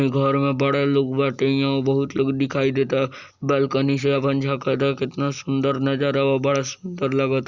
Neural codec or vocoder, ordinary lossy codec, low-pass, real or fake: none; Opus, 64 kbps; 7.2 kHz; real